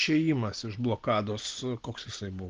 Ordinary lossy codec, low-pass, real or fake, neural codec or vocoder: Opus, 16 kbps; 7.2 kHz; real; none